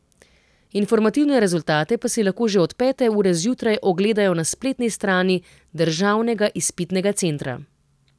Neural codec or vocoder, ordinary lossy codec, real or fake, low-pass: none; none; real; none